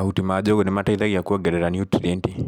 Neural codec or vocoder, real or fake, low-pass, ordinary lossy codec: none; real; 19.8 kHz; none